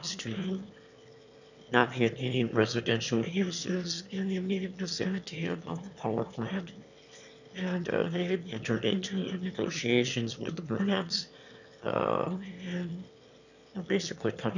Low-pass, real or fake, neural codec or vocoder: 7.2 kHz; fake; autoencoder, 22.05 kHz, a latent of 192 numbers a frame, VITS, trained on one speaker